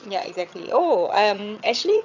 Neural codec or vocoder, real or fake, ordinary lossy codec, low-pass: vocoder, 22.05 kHz, 80 mel bands, HiFi-GAN; fake; none; 7.2 kHz